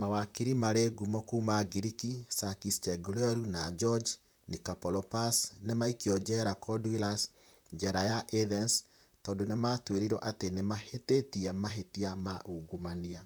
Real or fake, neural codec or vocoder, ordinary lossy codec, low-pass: fake; vocoder, 44.1 kHz, 128 mel bands, Pupu-Vocoder; none; none